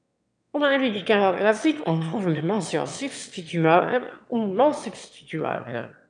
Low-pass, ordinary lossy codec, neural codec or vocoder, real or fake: 9.9 kHz; MP3, 96 kbps; autoencoder, 22.05 kHz, a latent of 192 numbers a frame, VITS, trained on one speaker; fake